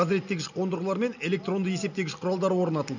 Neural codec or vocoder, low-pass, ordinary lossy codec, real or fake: none; 7.2 kHz; none; real